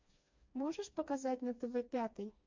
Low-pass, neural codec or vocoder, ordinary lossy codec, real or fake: 7.2 kHz; codec, 16 kHz, 2 kbps, FreqCodec, smaller model; MP3, 48 kbps; fake